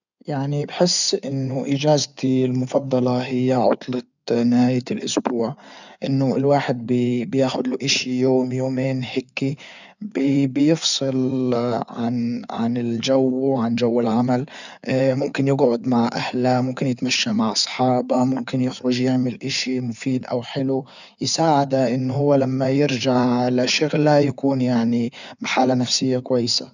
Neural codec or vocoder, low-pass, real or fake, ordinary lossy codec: codec, 16 kHz in and 24 kHz out, 2.2 kbps, FireRedTTS-2 codec; 7.2 kHz; fake; none